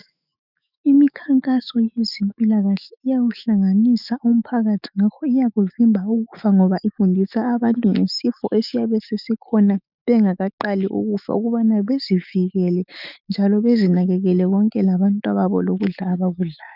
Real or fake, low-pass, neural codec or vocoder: fake; 5.4 kHz; autoencoder, 48 kHz, 128 numbers a frame, DAC-VAE, trained on Japanese speech